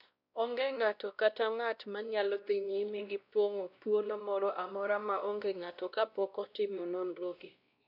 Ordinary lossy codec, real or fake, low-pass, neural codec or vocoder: none; fake; 5.4 kHz; codec, 16 kHz, 1 kbps, X-Codec, WavLM features, trained on Multilingual LibriSpeech